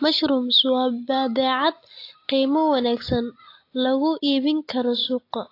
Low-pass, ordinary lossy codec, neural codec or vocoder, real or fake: 5.4 kHz; AAC, 32 kbps; none; real